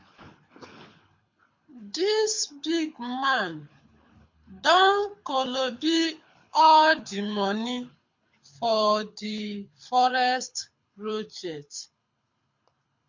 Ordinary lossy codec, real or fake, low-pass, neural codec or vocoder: MP3, 48 kbps; fake; 7.2 kHz; codec, 24 kHz, 6 kbps, HILCodec